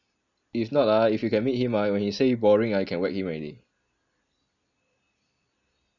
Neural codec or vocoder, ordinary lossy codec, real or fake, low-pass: none; none; real; 7.2 kHz